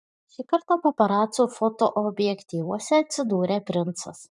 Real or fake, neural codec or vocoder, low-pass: fake; vocoder, 44.1 kHz, 128 mel bands every 512 samples, BigVGAN v2; 10.8 kHz